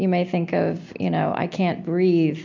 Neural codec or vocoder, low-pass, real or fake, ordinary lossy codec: none; 7.2 kHz; real; MP3, 64 kbps